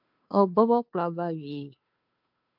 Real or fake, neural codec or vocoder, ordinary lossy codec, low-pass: fake; codec, 16 kHz, 2 kbps, FunCodec, trained on Chinese and English, 25 frames a second; MP3, 48 kbps; 5.4 kHz